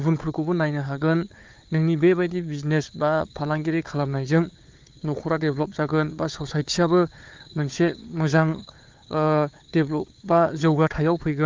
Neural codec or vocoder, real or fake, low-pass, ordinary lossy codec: codec, 16 kHz, 4 kbps, FunCodec, trained on Chinese and English, 50 frames a second; fake; 7.2 kHz; Opus, 32 kbps